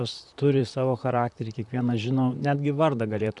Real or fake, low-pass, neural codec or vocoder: fake; 10.8 kHz; vocoder, 44.1 kHz, 128 mel bands, Pupu-Vocoder